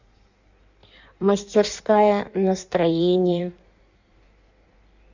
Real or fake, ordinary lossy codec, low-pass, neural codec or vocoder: fake; none; 7.2 kHz; codec, 16 kHz in and 24 kHz out, 1.1 kbps, FireRedTTS-2 codec